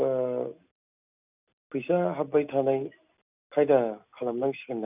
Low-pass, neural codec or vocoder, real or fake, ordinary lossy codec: 3.6 kHz; none; real; none